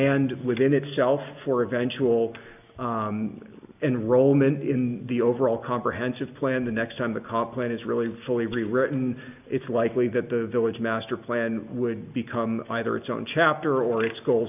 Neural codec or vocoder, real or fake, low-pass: none; real; 3.6 kHz